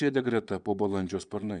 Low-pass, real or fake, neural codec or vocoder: 9.9 kHz; fake; vocoder, 22.05 kHz, 80 mel bands, Vocos